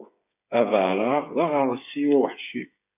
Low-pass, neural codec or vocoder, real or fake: 3.6 kHz; codec, 16 kHz, 8 kbps, FreqCodec, smaller model; fake